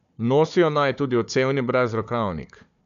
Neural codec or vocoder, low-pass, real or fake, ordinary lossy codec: codec, 16 kHz, 4 kbps, FunCodec, trained on Chinese and English, 50 frames a second; 7.2 kHz; fake; AAC, 96 kbps